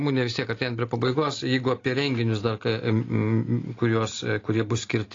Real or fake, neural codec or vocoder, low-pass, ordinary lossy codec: real; none; 7.2 kHz; AAC, 32 kbps